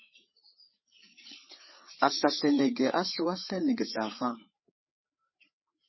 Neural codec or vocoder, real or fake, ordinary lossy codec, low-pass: codec, 16 kHz, 4 kbps, FreqCodec, larger model; fake; MP3, 24 kbps; 7.2 kHz